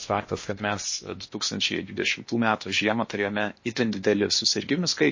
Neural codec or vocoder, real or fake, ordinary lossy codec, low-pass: codec, 16 kHz in and 24 kHz out, 0.8 kbps, FocalCodec, streaming, 65536 codes; fake; MP3, 32 kbps; 7.2 kHz